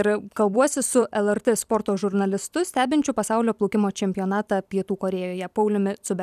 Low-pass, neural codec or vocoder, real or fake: 14.4 kHz; vocoder, 44.1 kHz, 128 mel bands every 256 samples, BigVGAN v2; fake